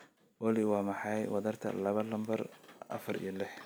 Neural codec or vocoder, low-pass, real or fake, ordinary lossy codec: none; none; real; none